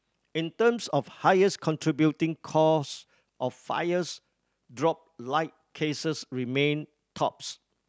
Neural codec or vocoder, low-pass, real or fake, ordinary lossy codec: none; none; real; none